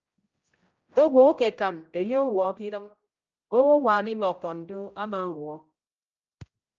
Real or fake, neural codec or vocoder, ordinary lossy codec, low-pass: fake; codec, 16 kHz, 0.5 kbps, X-Codec, HuBERT features, trained on general audio; Opus, 24 kbps; 7.2 kHz